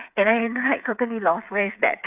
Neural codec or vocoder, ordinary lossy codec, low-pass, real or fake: codec, 16 kHz, 8 kbps, FreqCodec, smaller model; none; 3.6 kHz; fake